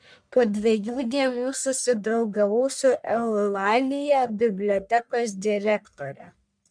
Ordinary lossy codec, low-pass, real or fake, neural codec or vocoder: MP3, 96 kbps; 9.9 kHz; fake; codec, 44.1 kHz, 1.7 kbps, Pupu-Codec